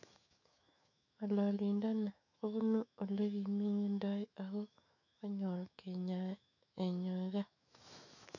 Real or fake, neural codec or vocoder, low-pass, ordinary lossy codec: fake; autoencoder, 48 kHz, 128 numbers a frame, DAC-VAE, trained on Japanese speech; 7.2 kHz; none